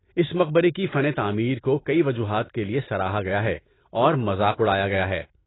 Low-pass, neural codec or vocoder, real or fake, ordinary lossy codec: 7.2 kHz; none; real; AAC, 16 kbps